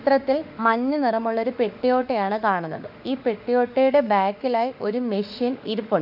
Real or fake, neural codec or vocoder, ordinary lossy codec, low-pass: fake; autoencoder, 48 kHz, 32 numbers a frame, DAC-VAE, trained on Japanese speech; none; 5.4 kHz